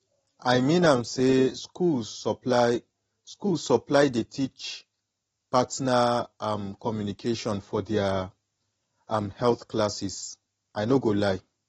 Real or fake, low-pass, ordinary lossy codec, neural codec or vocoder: real; 19.8 kHz; AAC, 24 kbps; none